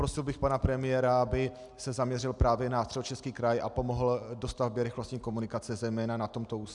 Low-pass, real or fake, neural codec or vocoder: 10.8 kHz; real; none